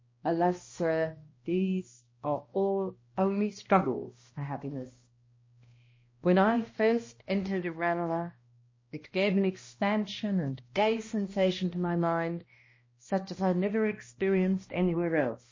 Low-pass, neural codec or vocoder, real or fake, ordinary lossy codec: 7.2 kHz; codec, 16 kHz, 1 kbps, X-Codec, HuBERT features, trained on balanced general audio; fake; MP3, 32 kbps